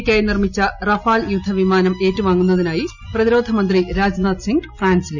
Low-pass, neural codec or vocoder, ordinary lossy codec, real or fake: 7.2 kHz; none; none; real